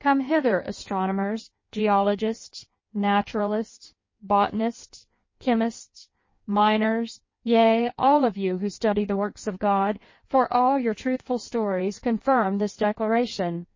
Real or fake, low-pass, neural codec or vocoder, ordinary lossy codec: fake; 7.2 kHz; codec, 16 kHz in and 24 kHz out, 1.1 kbps, FireRedTTS-2 codec; MP3, 32 kbps